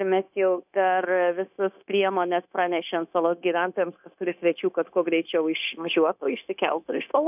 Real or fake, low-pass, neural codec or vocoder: fake; 3.6 kHz; codec, 16 kHz, 0.9 kbps, LongCat-Audio-Codec